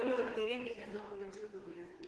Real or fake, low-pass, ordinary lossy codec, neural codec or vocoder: fake; 10.8 kHz; Opus, 16 kbps; codec, 24 kHz, 1 kbps, SNAC